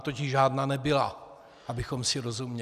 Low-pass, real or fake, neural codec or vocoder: 14.4 kHz; real; none